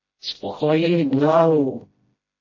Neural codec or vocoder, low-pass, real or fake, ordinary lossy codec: codec, 16 kHz, 0.5 kbps, FreqCodec, smaller model; 7.2 kHz; fake; MP3, 32 kbps